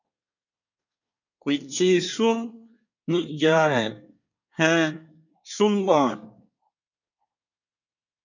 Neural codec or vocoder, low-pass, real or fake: codec, 24 kHz, 1 kbps, SNAC; 7.2 kHz; fake